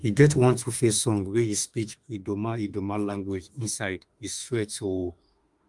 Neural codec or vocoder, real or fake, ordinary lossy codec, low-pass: autoencoder, 48 kHz, 32 numbers a frame, DAC-VAE, trained on Japanese speech; fake; Opus, 32 kbps; 10.8 kHz